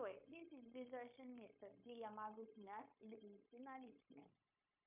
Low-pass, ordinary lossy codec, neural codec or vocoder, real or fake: 3.6 kHz; MP3, 32 kbps; codec, 16 kHz, 0.9 kbps, LongCat-Audio-Codec; fake